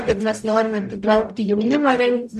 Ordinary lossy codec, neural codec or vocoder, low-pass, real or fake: MP3, 96 kbps; codec, 44.1 kHz, 0.9 kbps, DAC; 14.4 kHz; fake